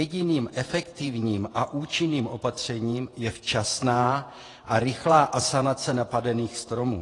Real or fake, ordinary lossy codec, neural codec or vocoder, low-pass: fake; AAC, 32 kbps; vocoder, 48 kHz, 128 mel bands, Vocos; 10.8 kHz